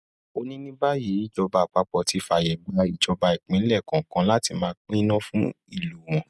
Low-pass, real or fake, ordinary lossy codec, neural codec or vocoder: none; real; none; none